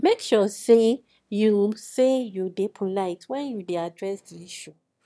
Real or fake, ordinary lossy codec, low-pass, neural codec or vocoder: fake; none; none; autoencoder, 22.05 kHz, a latent of 192 numbers a frame, VITS, trained on one speaker